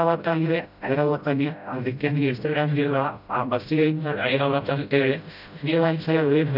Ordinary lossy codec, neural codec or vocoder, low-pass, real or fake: none; codec, 16 kHz, 0.5 kbps, FreqCodec, smaller model; 5.4 kHz; fake